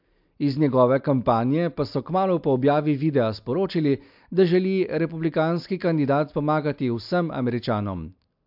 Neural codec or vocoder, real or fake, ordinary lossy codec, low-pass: none; real; MP3, 48 kbps; 5.4 kHz